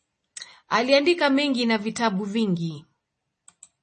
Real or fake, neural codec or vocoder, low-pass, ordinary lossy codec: real; none; 10.8 kHz; MP3, 32 kbps